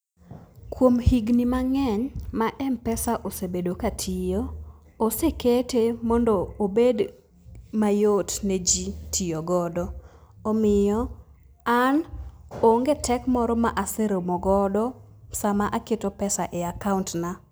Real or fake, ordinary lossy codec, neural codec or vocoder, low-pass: real; none; none; none